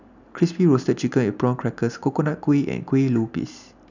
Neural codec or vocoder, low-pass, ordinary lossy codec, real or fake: none; 7.2 kHz; none; real